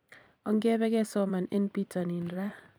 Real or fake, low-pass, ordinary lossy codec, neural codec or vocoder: fake; none; none; vocoder, 44.1 kHz, 128 mel bands every 256 samples, BigVGAN v2